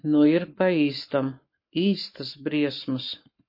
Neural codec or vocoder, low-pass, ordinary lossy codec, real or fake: codec, 44.1 kHz, 7.8 kbps, Pupu-Codec; 5.4 kHz; MP3, 32 kbps; fake